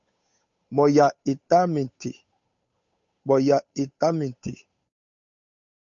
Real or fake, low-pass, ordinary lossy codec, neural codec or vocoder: fake; 7.2 kHz; MP3, 48 kbps; codec, 16 kHz, 8 kbps, FunCodec, trained on Chinese and English, 25 frames a second